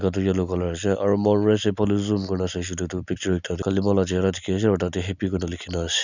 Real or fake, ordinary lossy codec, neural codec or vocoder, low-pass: real; none; none; 7.2 kHz